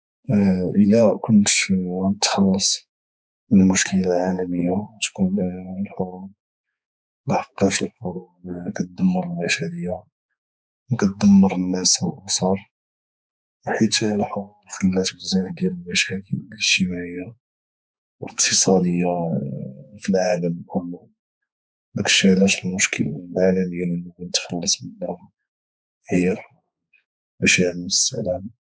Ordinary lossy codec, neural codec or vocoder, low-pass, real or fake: none; codec, 16 kHz, 4 kbps, X-Codec, HuBERT features, trained on balanced general audio; none; fake